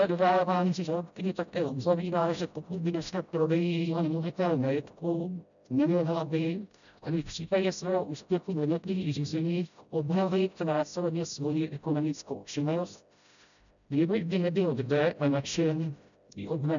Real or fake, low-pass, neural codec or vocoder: fake; 7.2 kHz; codec, 16 kHz, 0.5 kbps, FreqCodec, smaller model